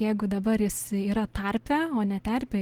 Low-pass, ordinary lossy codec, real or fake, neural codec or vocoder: 19.8 kHz; Opus, 16 kbps; real; none